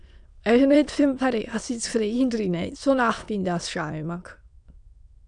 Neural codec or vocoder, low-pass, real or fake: autoencoder, 22.05 kHz, a latent of 192 numbers a frame, VITS, trained on many speakers; 9.9 kHz; fake